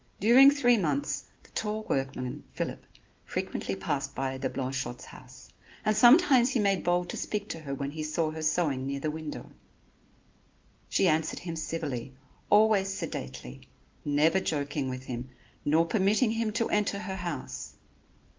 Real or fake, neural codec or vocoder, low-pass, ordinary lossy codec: real; none; 7.2 kHz; Opus, 32 kbps